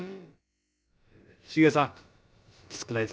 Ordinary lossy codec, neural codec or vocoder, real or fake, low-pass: none; codec, 16 kHz, about 1 kbps, DyCAST, with the encoder's durations; fake; none